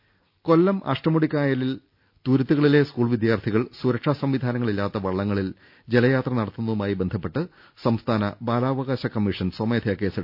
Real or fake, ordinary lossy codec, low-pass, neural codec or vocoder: real; none; 5.4 kHz; none